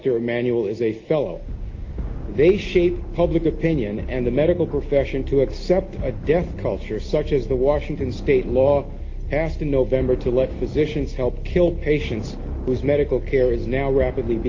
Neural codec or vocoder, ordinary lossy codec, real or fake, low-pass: none; Opus, 32 kbps; real; 7.2 kHz